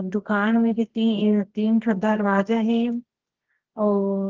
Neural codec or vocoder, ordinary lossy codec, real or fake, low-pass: codec, 24 kHz, 0.9 kbps, WavTokenizer, medium music audio release; Opus, 16 kbps; fake; 7.2 kHz